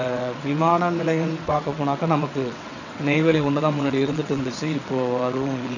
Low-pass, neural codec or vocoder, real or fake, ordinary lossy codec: 7.2 kHz; vocoder, 22.05 kHz, 80 mel bands, WaveNeXt; fake; none